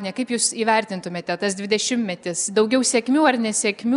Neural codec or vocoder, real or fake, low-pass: none; real; 10.8 kHz